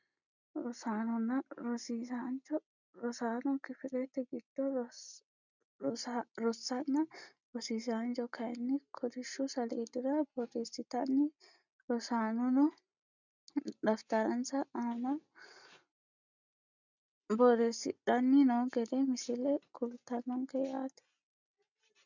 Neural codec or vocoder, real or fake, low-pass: none; real; 7.2 kHz